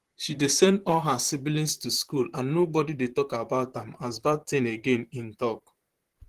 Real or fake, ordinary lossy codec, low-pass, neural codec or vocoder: fake; Opus, 16 kbps; 14.4 kHz; vocoder, 44.1 kHz, 128 mel bands, Pupu-Vocoder